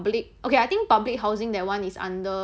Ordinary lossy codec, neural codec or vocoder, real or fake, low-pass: none; none; real; none